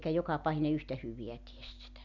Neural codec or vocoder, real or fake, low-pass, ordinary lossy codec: none; real; 7.2 kHz; none